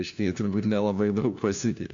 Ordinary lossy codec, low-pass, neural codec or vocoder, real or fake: AAC, 64 kbps; 7.2 kHz; codec, 16 kHz, 1 kbps, FunCodec, trained on LibriTTS, 50 frames a second; fake